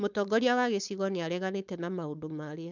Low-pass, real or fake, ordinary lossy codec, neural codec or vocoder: 7.2 kHz; fake; none; codec, 16 kHz, 4.8 kbps, FACodec